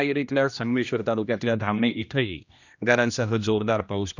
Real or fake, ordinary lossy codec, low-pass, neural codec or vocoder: fake; none; 7.2 kHz; codec, 16 kHz, 1 kbps, X-Codec, HuBERT features, trained on general audio